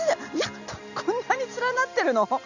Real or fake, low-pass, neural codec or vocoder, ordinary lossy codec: real; 7.2 kHz; none; none